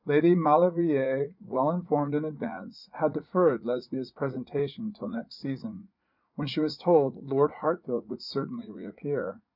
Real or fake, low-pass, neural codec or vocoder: fake; 5.4 kHz; vocoder, 44.1 kHz, 80 mel bands, Vocos